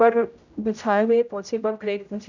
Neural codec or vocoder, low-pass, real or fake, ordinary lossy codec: codec, 16 kHz, 0.5 kbps, X-Codec, HuBERT features, trained on general audio; 7.2 kHz; fake; none